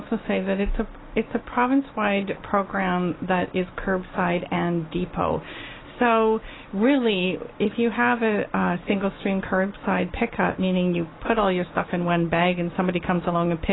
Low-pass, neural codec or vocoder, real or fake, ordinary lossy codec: 7.2 kHz; none; real; AAC, 16 kbps